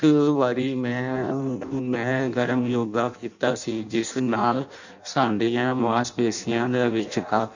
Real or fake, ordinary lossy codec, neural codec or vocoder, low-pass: fake; none; codec, 16 kHz in and 24 kHz out, 0.6 kbps, FireRedTTS-2 codec; 7.2 kHz